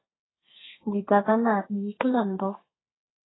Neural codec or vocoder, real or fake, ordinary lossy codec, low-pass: codec, 32 kHz, 1.9 kbps, SNAC; fake; AAC, 16 kbps; 7.2 kHz